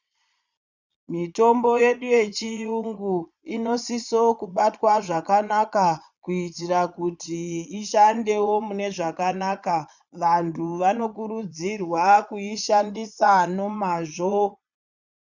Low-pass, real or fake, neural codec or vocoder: 7.2 kHz; fake; vocoder, 22.05 kHz, 80 mel bands, Vocos